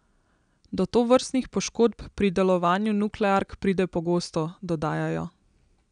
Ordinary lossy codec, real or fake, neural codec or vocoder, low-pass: none; real; none; 9.9 kHz